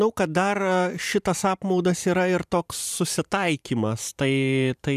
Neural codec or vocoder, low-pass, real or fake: vocoder, 44.1 kHz, 128 mel bands every 512 samples, BigVGAN v2; 14.4 kHz; fake